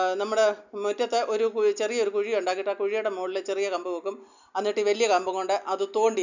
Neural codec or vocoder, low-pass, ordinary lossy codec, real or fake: none; 7.2 kHz; none; real